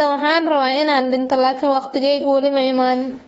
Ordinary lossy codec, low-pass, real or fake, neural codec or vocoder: AAC, 24 kbps; 19.8 kHz; fake; autoencoder, 48 kHz, 32 numbers a frame, DAC-VAE, trained on Japanese speech